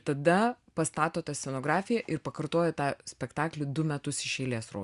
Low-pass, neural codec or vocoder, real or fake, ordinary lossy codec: 10.8 kHz; none; real; Opus, 64 kbps